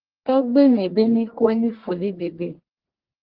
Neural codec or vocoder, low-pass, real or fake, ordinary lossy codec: codec, 44.1 kHz, 1.7 kbps, Pupu-Codec; 5.4 kHz; fake; Opus, 16 kbps